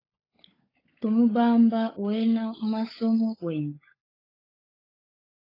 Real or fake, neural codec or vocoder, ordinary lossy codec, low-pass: fake; codec, 16 kHz, 16 kbps, FunCodec, trained on LibriTTS, 50 frames a second; AAC, 24 kbps; 5.4 kHz